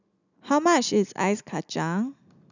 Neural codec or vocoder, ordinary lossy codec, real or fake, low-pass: none; none; real; 7.2 kHz